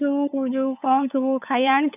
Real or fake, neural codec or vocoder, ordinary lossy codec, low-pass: fake; codec, 16 kHz, 8 kbps, FunCodec, trained on LibriTTS, 25 frames a second; none; 3.6 kHz